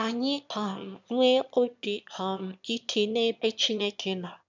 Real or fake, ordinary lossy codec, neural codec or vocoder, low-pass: fake; none; autoencoder, 22.05 kHz, a latent of 192 numbers a frame, VITS, trained on one speaker; 7.2 kHz